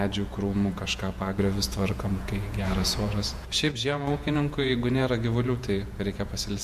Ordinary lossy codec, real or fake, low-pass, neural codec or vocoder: MP3, 64 kbps; fake; 14.4 kHz; vocoder, 48 kHz, 128 mel bands, Vocos